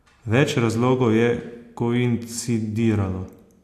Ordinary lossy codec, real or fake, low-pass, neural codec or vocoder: AAC, 64 kbps; real; 14.4 kHz; none